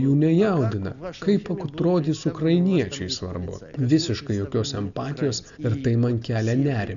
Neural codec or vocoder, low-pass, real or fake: none; 7.2 kHz; real